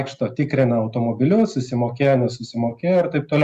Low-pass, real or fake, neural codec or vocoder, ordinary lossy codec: 14.4 kHz; real; none; Opus, 64 kbps